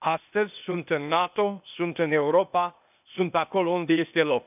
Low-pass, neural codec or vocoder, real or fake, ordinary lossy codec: 3.6 kHz; codec, 16 kHz, 0.8 kbps, ZipCodec; fake; none